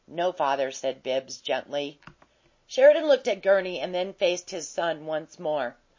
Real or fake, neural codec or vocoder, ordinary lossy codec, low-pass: real; none; MP3, 32 kbps; 7.2 kHz